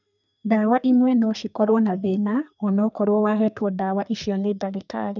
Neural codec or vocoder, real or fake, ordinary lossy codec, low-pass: codec, 32 kHz, 1.9 kbps, SNAC; fake; none; 7.2 kHz